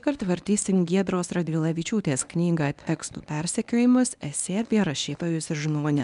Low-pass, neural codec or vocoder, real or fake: 10.8 kHz; codec, 24 kHz, 0.9 kbps, WavTokenizer, medium speech release version 1; fake